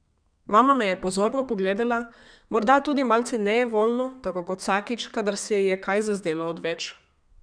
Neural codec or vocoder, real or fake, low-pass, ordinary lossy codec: codec, 32 kHz, 1.9 kbps, SNAC; fake; 9.9 kHz; none